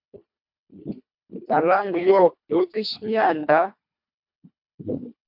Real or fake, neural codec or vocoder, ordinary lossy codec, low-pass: fake; codec, 24 kHz, 1.5 kbps, HILCodec; MP3, 48 kbps; 5.4 kHz